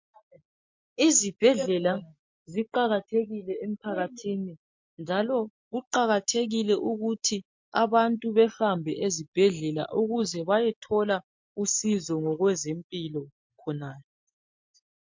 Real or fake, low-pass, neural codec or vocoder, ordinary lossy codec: real; 7.2 kHz; none; MP3, 48 kbps